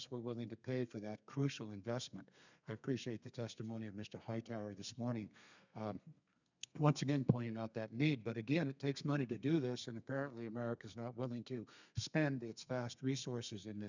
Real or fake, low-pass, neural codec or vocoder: fake; 7.2 kHz; codec, 32 kHz, 1.9 kbps, SNAC